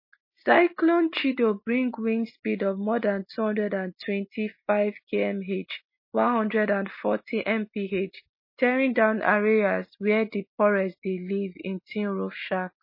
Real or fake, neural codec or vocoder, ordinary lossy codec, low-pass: real; none; MP3, 24 kbps; 5.4 kHz